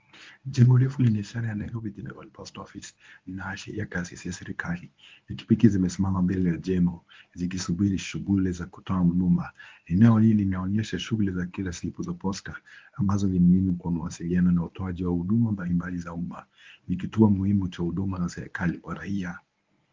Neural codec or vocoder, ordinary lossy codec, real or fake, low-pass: codec, 24 kHz, 0.9 kbps, WavTokenizer, medium speech release version 1; Opus, 24 kbps; fake; 7.2 kHz